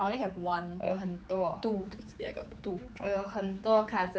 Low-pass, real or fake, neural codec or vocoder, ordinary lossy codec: none; fake; codec, 16 kHz, 4 kbps, X-Codec, HuBERT features, trained on general audio; none